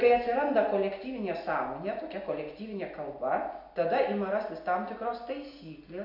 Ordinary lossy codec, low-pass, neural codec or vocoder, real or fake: MP3, 48 kbps; 5.4 kHz; none; real